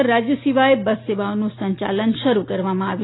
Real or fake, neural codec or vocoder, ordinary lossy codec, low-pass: real; none; AAC, 16 kbps; 7.2 kHz